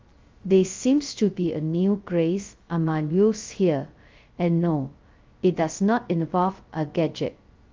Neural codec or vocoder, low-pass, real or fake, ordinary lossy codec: codec, 16 kHz, 0.2 kbps, FocalCodec; 7.2 kHz; fake; Opus, 32 kbps